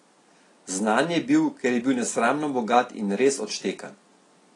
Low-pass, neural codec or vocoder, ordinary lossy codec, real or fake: 10.8 kHz; none; AAC, 32 kbps; real